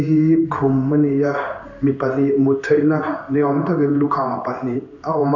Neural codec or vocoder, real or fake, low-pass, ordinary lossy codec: codec, 16 kHz in and 24 kHz out, 1 kbps, XY-Tokenizer; fake; 7.2 kHz; none